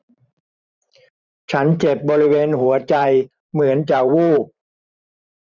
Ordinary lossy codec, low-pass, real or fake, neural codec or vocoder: none; 7.2 kHz; fake; vocoder, 44.1 kHz, 128 mel bands every 512 samples, BigVGAN v2